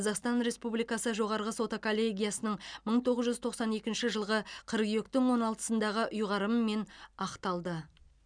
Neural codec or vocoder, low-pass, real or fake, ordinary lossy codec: none; 9.9 kHz; real; none